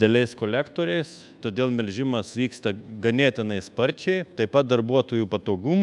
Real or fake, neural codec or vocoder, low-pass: fake; codec, 24 kHz, 1.2 kbps, DualCodec; 10.8 kHz